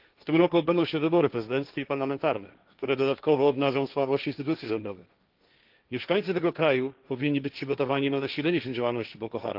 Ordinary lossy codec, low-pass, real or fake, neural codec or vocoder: Opus, 32 kbps; 5.4 kHz; fake; codec, 16 kHz, 1.1 kbps, Voila-Tokenizer